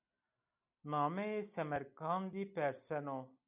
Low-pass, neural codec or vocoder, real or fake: 3.6 kHz; none; real